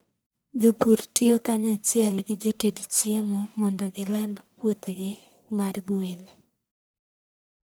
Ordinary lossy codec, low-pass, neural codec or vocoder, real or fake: none; none; codec, 44.1 kHz, 1.7 kbps, Pupu-Codec; fake